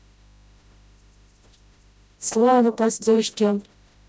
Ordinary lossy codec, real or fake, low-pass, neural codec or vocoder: none; fake; none; codec, 16 kHz, 0.5 kbps, FreqCodec, smaller model